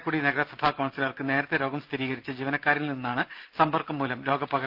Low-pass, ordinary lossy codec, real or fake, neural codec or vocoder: 5.4 kHz; Opus, 32 kbps; real; none